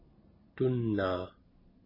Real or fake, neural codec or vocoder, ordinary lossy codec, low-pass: real; none; MP3, 24 kbps; 5.4 kHz